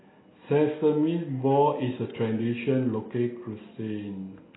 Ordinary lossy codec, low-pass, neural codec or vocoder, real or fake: AAC, 16 kbps; 7.2 kHz; none; real